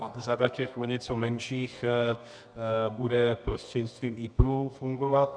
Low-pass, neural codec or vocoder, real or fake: 9.9 kHz; codec, 24 kHz, 0.9 kbps, WavTokenizer, medium music audio release; fake